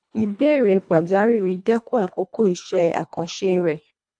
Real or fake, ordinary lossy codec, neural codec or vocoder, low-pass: fake; none; codec, 24 kHz, 1.5 kbps, HILCodec; 9.9 kHz